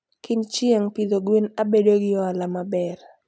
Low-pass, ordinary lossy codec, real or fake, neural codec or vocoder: none; none; real; none